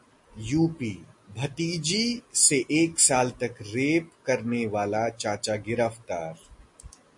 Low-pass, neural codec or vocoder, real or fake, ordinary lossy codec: 10.8 kHz; none; real; MP3, 48 kbps